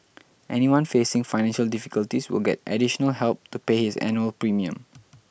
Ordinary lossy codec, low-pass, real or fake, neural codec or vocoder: none; none; real; none